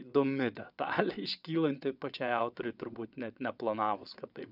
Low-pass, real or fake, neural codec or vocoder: 5.4 kHz; fake; vocoder, 22.05 kHz, 80 mel bands, WaveNeXt